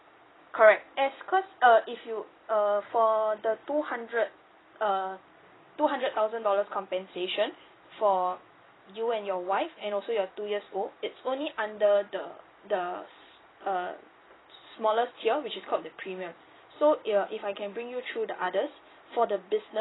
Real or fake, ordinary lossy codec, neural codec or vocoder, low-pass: fake; AAC, 16 kbps; vocoder, 44.1 kHz, 128 mel bands every 256 samples, BigVGAN v2; 7.2 kHz